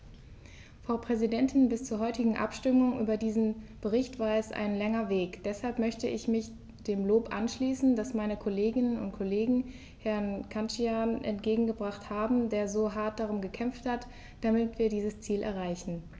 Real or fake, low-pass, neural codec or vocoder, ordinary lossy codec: real; none; none; none